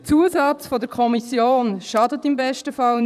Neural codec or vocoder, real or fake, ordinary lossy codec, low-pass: vocoder, 44.1 kHz, 128 mel bands, Pupu-Vocoder; fake; none; 14.4 kHz